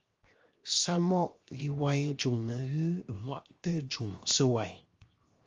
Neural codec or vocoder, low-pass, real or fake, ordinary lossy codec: codec, 16 kHz, 0.7 kbps, FocalCodec; 7.2 kHz; fake; Opus, 32 kbps